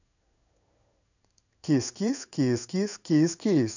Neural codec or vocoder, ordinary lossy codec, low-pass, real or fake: codec, 16 kHz in and 24 kHz out, 1 kbps, XY-Tokenizer; none; 7.2 kHz; fake